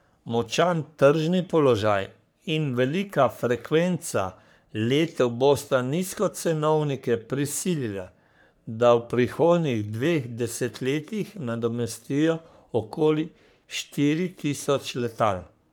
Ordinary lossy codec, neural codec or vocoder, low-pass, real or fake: none; codec, 44.1 kHz, 3.4 kbps, Pupu-Codec; none; fake